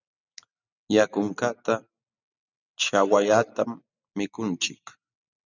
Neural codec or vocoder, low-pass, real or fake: none; 7.2 kHz; real